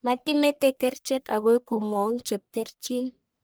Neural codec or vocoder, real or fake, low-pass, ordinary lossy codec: codec, 44.1 kHz, 1.7 kbps, Pupu-Codec; fake; none; none